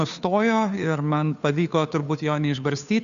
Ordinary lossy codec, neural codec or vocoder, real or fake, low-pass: MP3, 96 kbps; codec, 16 kHz, 2 kbps, FunCodec, trained on Chinese and English, 25 frames a second; fake; 7.2 kHz